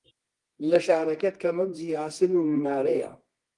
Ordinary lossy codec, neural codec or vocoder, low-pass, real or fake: Opus, 24 kbps; codec, 24 kHz, 0.9 kbps, WavTokenizer, medium music audio release; 10.8 kHz; fake